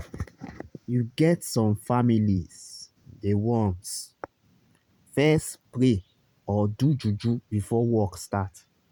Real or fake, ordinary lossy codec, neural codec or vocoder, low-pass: fake; none; vocoder, 44.1 kHz, 128 mel bands every 512 samples, BigVGAN v2; 19.8 kHz